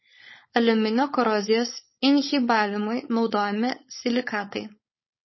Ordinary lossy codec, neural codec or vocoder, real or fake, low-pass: MP3, 24 kbps; codec, 16 kHz, 4.8 kbps, FACodec; fake; 7.2 kHz